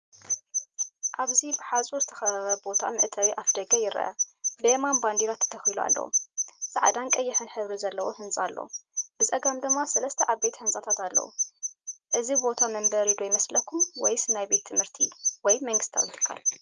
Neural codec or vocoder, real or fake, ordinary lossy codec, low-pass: none; real; Opus, 32 kbps; 7.2 kHz